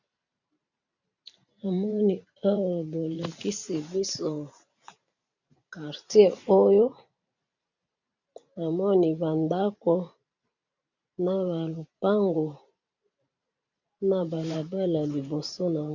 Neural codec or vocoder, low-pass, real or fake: vocoder, 44.1 kHz, 128 mel bands every 512 samples, BigVGAN v2; 7.2 kHz; fake